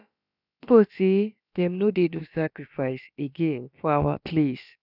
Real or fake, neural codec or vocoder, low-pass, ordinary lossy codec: fake; codec, 16 kHz, about 1 kbps, DyCAST, with the encoder's durations; 5.4 kHz; none